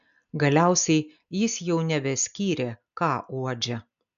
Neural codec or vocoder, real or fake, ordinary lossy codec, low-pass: none; real; MP3, 96 kbps; 7.2 kHz